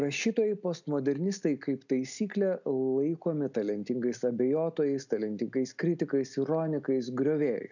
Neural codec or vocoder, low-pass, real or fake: none; 7.2 kHz; real